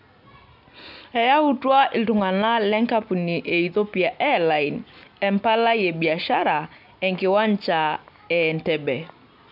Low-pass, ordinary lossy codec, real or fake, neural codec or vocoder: 5.4 kHz; none; real; none